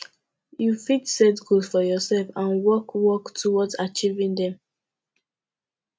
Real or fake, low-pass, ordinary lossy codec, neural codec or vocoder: real; none; none; none